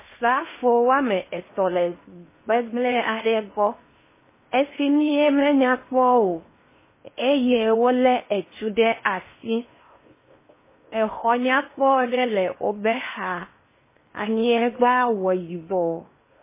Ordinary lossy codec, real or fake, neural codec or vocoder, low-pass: MP3, 16 kbps; fake; codec, 16 kHz in and 24 kHz out, 0.8 kbps, FocalCodec, streaming, 65536 codes; 3.6 kHz